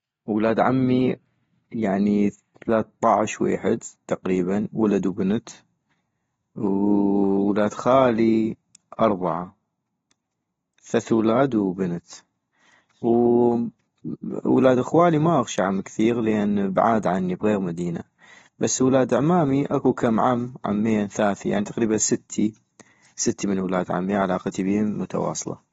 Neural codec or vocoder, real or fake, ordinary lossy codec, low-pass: none; real; AAC, 24 kbps; 19.8 kHz